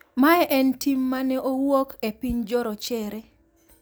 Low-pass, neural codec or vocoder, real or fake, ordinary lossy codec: none; none; real; none